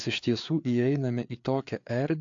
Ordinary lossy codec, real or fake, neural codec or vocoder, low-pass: AAC, 48 kbps; fake; codec, 16 kHz, 4 kbps, FreqCodec, larger model; 7.2 kHz